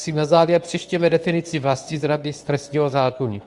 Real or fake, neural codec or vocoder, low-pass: fake; codec, 24 kHz, 0.9 kbps, WavTokenizer, medium speech release version 2; 10.8 kHz